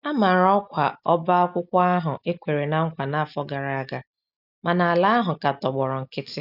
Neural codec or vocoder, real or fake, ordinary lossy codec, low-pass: none; real; none; 5.4 kHz